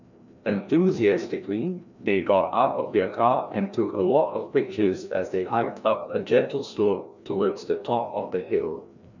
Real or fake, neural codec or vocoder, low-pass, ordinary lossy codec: fake; codec, 16 kHz, 1 kbps, FreqCodec, larger model; 7.2 kHz; none